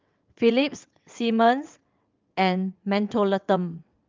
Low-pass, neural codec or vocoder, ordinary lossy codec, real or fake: 7.2 kHz; vocoder, 22.05 kHz, 80 mel bands, WaveNeXt; Opus, 24 kbps; fake